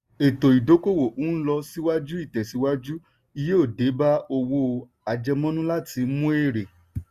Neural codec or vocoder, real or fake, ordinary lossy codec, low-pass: none; real; Opus, 64 kbps; 14.4 kHz